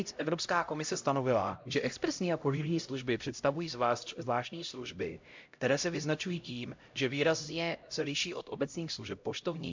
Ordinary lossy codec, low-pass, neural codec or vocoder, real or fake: MP3, 64 kbps; 7.2 kHz; codec, 16 kHz, 0.5 kbps, X-Codec, HuBERT features, trained on LibriSpeech; fake